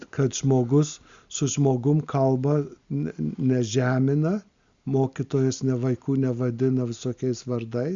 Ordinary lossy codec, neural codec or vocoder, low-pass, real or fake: Opus, 64 kbps; none; 7.2 kHz; real